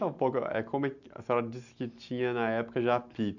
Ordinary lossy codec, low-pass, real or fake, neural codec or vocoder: none; 7.2 kHz; real; none